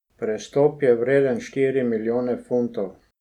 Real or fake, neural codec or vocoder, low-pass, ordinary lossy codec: real; none; 19.8 kHz; none